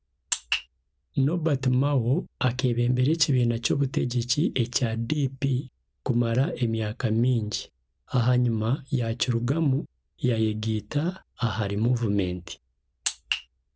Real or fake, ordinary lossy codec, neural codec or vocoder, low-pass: real; none; none; none